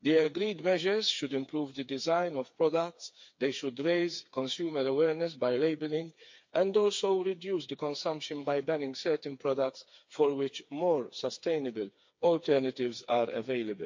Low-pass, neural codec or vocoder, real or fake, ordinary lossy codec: 7.2 kHz; codec, 16 kHz, 4 kbps, FreqCodec, smaller model; fake; MP3, 48 kbps